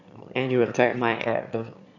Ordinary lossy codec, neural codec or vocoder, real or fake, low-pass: none; autoencoder, 22.05 kHz, a latent of 192 numbers a frame, VITS, trained on one speaker; fake; 7.2 kHz